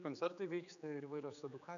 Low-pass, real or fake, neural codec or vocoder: 7.2 kHz; fake; codec, 16 kHz, 4 kbps, X-Codec, HuBERT features, trained on general audio